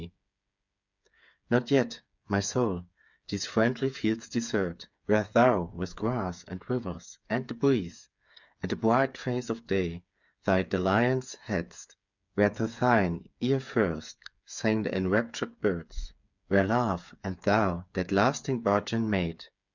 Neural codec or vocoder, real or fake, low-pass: codec, 16 kHz, 8 kbps, FreqCodec, smaller model; fake; 7.2 kHz